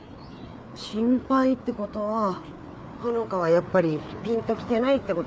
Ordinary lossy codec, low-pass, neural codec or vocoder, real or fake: none; none; codec, 16 kHz, 4 kbps, FreqCodec, larger model; fake